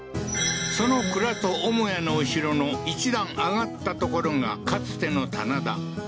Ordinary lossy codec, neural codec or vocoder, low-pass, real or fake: none; none; none; real